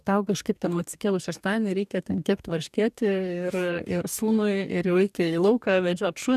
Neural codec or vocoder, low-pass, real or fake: codec, 44.1 kHz, 2.6 kbps, DAC; 14.4 kHz; fake